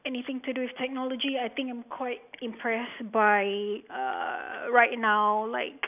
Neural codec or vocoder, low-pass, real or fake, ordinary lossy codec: none; 3.6 kHz; real; none